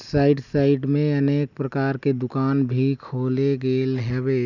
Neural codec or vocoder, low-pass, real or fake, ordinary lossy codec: none; 7.2 kHz; real; none